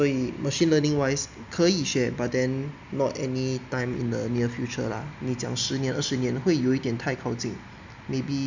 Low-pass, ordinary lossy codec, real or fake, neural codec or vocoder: 7.2 kHz; none; real; none